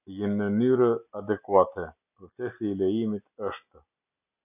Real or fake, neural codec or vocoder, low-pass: real; none; 3.6 kHz